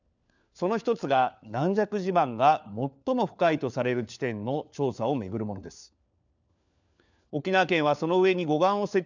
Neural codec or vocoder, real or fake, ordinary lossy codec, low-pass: codec, 16 kHz, 4 kbps, FunCodec, trained on LibriTTS, 50 frames a second; fake; none; 7.2 kHz